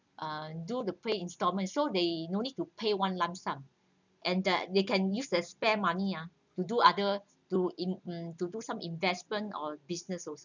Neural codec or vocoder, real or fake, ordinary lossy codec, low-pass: none; real; none; 7.2 kHz